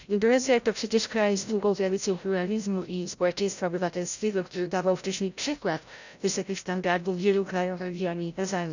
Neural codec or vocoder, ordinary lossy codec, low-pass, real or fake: codec, 16 kHz, 0.5 kbps, FreqCodec, larger model; none; 7.2 kHz; fake